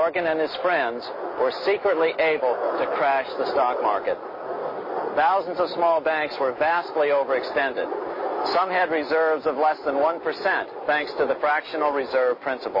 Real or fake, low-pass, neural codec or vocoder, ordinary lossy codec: real; 5.4 kHz; none; MP3, 24 kbps